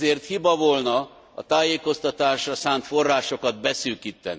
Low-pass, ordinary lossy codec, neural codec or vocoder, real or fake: none; none; none; real